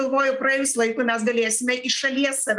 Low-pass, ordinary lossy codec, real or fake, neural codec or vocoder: 10.8 kHz; Opus, 32 kbps; real; none